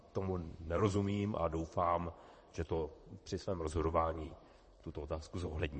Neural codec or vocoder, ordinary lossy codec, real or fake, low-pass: vocoder, 44.1 kHz, 128 mel bands, Pupu-Vocoder; MP3, 32 kbps; fake; 10.8 kHz